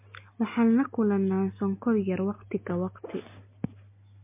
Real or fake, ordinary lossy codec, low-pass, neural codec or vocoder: real; MP3, 24 kbps; 3.6 kHz; none